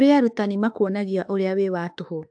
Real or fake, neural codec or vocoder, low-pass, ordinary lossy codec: fake; autoencoder, 48 kHz, 32 numbers a frame, DAC-VAE, trained on Japanese speech; 9.9 kHz; AAC, 64 kbps